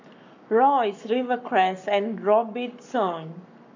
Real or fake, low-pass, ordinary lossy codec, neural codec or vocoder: fake; 7.2 kHz; MP3, 64 kbps; codec, 44.1 kHz, 7.8 kbps, Pupu-Codec